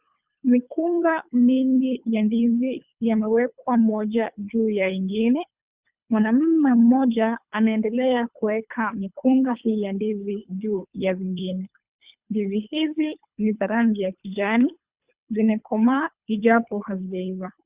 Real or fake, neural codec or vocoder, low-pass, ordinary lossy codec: fake; codec, 24 kHz, 3 kbps, HILCodec; 3.6 kHz; Opus, 64 kbps